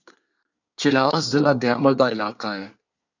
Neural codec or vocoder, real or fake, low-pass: codec, 24 kHz, 1 kbps, SNAC; fake; 7.2 kHz